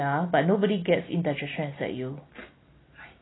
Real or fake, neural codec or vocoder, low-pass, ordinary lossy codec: real; none; 7.2 kHz; AAC, 16 kbps